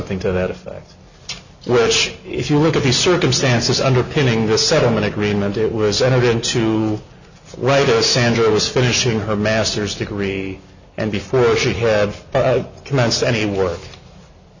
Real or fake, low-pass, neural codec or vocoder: real; 7.2 kHz; none